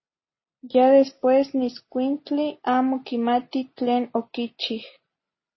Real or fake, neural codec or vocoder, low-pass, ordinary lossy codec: real; none; 7.2 kHz; MP3, 24 kbps